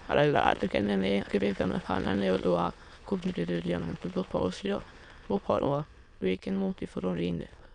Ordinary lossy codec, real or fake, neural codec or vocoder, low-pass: none; fake; autoencoder, 22.05 kHz, a latent of 192 numbers a frame, VITS, trained on many speakers; 9.9 kHz